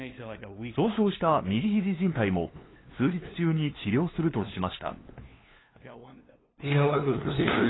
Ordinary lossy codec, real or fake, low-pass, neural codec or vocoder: AAC, 16 kbps; fake; 7.2 kHz; codec, 16 kHz, 2 kbps, FunCodec, trained on LibriTTS, 25 frames a second